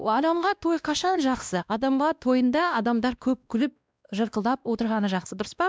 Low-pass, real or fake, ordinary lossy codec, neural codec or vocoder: none; fake; none; codec, 16 kHz, 1 kbps, X-Codec, WavLM features, trained on Multilingual LibriSpeech